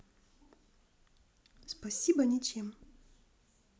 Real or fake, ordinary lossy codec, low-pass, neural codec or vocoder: real; none; none; none